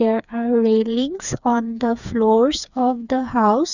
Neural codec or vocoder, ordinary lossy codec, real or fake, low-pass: codec, 16 kHz, 4 kbps, FreqCodec, smaller model; none; fake; 7.2 kHz